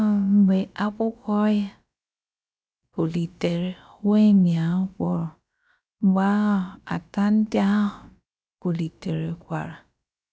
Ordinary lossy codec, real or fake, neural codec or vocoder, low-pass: none; fake; codec, 16 kHz, about 1 kbps, DyCAST, with the encoder's durations; none